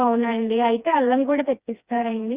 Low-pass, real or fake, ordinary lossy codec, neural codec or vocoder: 3.6 kHz; fake; Opus, 64 kbps; codec, 16 kHz, 2 kbps, FreqCodec, smaller model